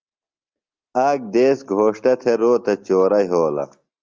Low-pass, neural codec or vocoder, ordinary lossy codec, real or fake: 7.2 kHz; none; Opus, 32 kbps; real